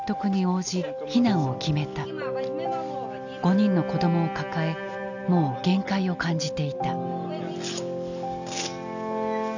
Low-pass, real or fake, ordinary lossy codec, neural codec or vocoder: 7.2 kHz; real; none; none